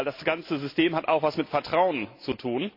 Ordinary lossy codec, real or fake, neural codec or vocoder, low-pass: MP3, 32 kbps; real; none; 5.4 kHz